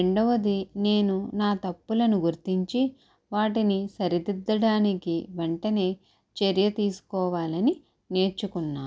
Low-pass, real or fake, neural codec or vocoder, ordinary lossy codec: none; real; none; none